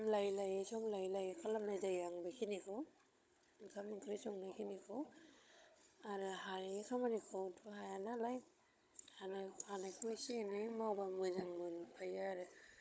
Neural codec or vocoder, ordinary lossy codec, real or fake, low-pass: codec, 16 kHz, 16 kbps, FunCodec, trained on Chinese and English, 50 frames a second; none; fake; none